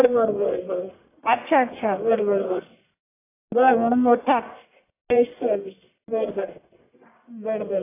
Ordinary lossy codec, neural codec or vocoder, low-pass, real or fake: AAC, 24 kbps; codec, 44.1 kHz, 1.7 kbps, Pupu-Codec; 3.6 kHz; fake